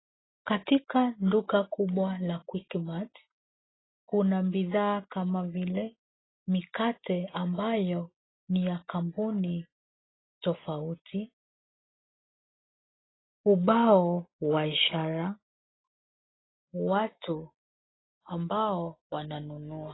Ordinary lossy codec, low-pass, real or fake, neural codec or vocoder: AAC, 16 kbps; 7.2 kHz; real; none